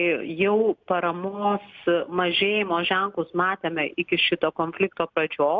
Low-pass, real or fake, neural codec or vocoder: 7.2 kHz; real; none